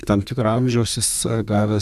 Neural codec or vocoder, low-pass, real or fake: codec, 32 kHz, 1.9 kbps, SNAC; 14.4 kHz; fake